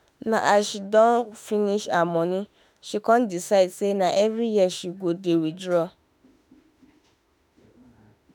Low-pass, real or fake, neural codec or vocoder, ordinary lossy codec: none; fake; autoencoder, 48 kHz, 32 numbers a frame, DAC-VAE, trained on Japanese speech; none